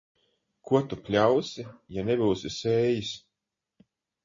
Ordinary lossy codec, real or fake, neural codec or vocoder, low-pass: MP3, 32 kbps; real; none; 7.2 kHz